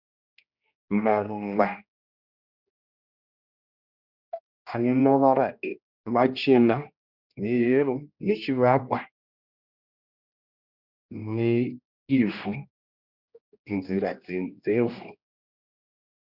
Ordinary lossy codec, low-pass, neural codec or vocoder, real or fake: Opus, 64 kbps; 5.4 kHz; codec, 16 kHz, 1 kbps, X-Codec, HuBERT features, trained on general audio; fake